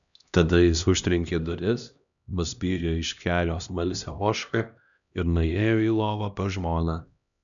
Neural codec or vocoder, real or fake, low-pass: codec, 16 kHz, 1 kbps, X-Codec, HuBERT features, trained on LibriSpeech; fake; 7.2 kHz